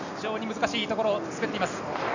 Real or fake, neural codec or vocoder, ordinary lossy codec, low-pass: real; none; none; 7.2 kHz